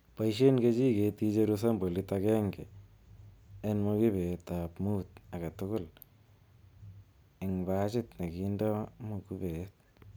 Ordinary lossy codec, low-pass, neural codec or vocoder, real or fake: none; none; none; real